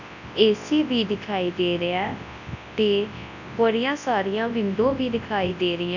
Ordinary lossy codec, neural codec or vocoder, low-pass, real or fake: none; codec, 24 kHz, 0.9 kbps, WavTokenizer, large speech release; 7.2 kHz; fake